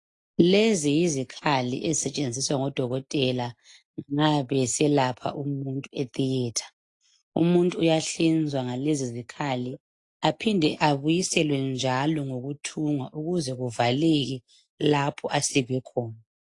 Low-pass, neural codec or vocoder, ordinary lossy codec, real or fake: 10.8 kHz; none; AAC, 48 kbps; real